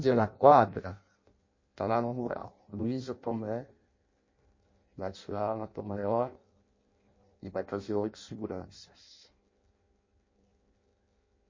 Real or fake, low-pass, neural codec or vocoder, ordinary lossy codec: fake; 7.2 kHz; codec, 16 kHz in and 24 kHz out, 0.6 kbps, FireRedTTS-2 codec; MP3, 32 kbps